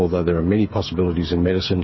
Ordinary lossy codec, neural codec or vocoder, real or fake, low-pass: MP3, 24 kbps; codec, 16 kHz, 8 kbps, FreqCodec, smaller model; fake; 7.2 kHz